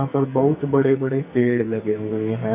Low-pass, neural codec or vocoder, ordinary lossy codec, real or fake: 3.6 kHz; codec, 44.1 kHz, 2.6 kbps, SNAC; none; fake